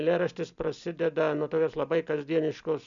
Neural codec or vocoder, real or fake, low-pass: none; real; 7.2 kHz